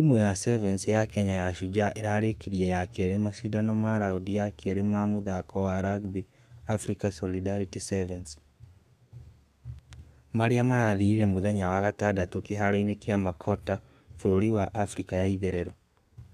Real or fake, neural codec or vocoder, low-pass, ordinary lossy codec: fake; codec, 32 kHz, 1.9 kbps, SNAC; 14.4 kHz; none